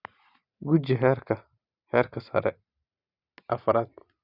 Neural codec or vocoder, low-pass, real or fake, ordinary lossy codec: vocoder, 44.1 kHz, 128 mel bands every 512 samples, BigVGAN v2; 5.4 kHz; fake; Opus, 64 kbps